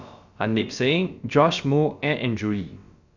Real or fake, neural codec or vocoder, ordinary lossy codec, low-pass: fake; codec, 16 kHz, about 1 kbps, DyCAST, with the encoder's durations; Opus, 64 kbps; 7.2 kHz